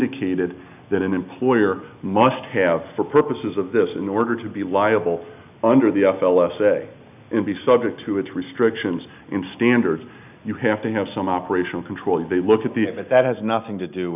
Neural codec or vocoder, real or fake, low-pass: none; real; 3.6 kHz